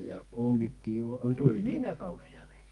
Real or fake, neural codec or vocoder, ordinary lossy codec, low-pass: fake; codec, 24 kHz, 0.9 kbps, WavTokenizer, medium music audio release; Opus, 32 kbps; 10.8 kHz